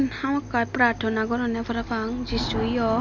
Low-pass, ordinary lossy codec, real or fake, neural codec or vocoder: 7.2 kHz; none; real; none